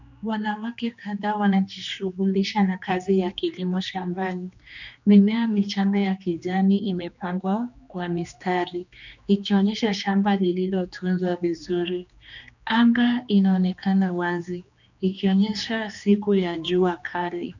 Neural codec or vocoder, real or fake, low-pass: codec, 16 kHz, 2 kbps, X-Codec, HuBERT features, trained on general audio; fake; 7.2 kHz